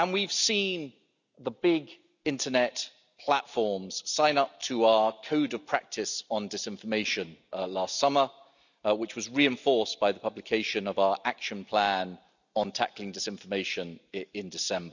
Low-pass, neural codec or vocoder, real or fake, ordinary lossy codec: 7.2 kHz; none; real; none